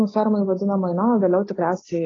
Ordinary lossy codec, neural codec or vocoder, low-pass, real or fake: AAC, 32 kbps; none; 7.2 kHz; real